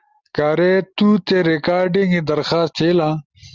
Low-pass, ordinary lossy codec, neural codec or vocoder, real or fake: 7.2 kHz; Opus, 32 kbps; none; real